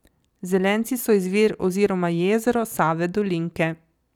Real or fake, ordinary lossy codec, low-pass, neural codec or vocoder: fake; none; 19.8 kHz; vocoder, 44.1 kHz, 128 mel bands every 256 samples, BigVGAN v2